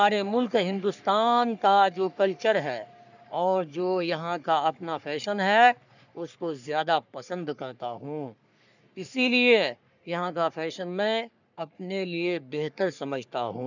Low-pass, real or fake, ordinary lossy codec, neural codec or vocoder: 7.2 kHz; fake; none; codec, 44.1 kHz, 3.4 kbps, Pupu-Codec